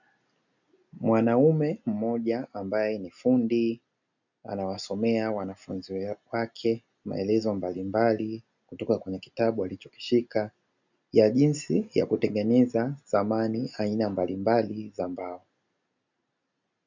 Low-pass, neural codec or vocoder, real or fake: 7.2 kHz; none; real